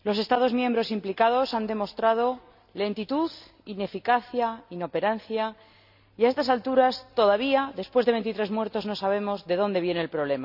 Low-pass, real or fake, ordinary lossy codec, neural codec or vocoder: 5.4 kHz; real; none; none